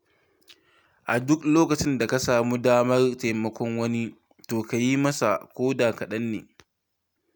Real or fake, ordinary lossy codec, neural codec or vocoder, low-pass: real; none; none; none